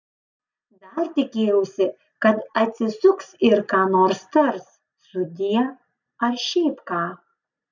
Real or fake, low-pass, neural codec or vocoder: real; 7.2 kHz; none